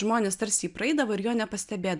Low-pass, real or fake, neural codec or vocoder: 10.8 kHz; real; none